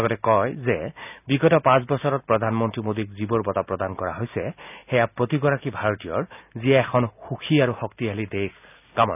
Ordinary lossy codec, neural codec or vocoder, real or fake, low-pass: none; none; real; 3.6 kHz